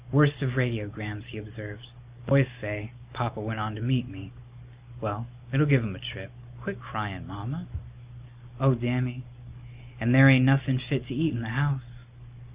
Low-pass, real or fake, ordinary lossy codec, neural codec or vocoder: 3.6 kHz; real; Opus, 64 kbps; none